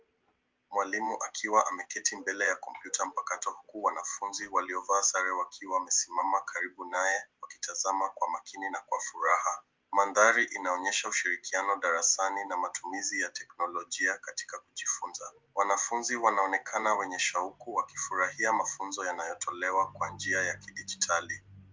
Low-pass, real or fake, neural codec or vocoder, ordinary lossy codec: 7.2 kHz; real; none; Opus, 32 kbps